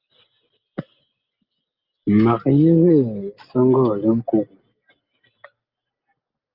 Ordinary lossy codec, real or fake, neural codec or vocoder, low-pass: Opus, 24 kbps; real; none; 5.4 kHz